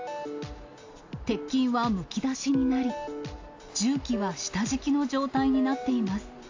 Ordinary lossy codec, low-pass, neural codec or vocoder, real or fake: AAC, 48 kbps; 7.2 kHz; none; real